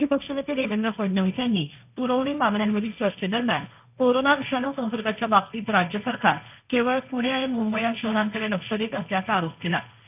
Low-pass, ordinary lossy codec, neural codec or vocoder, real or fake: 3.6 kHz; none; codec, 16 kHz, 1.1 kbps, Voila-Tokenizer; fake